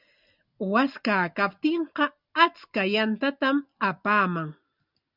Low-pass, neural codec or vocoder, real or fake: 5.4 kHz; none; real